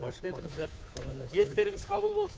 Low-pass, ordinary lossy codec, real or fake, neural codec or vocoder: none; none; fake; codec, 16 kHz, 2 kbps, FunCodec, trained on Chinese and English, 25 frames a second